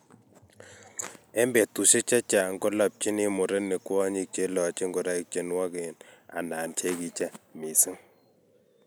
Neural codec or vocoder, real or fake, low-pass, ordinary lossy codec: none; real; none; none